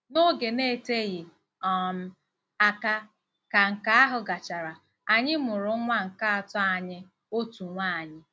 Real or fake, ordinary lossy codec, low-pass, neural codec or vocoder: real; none; none; none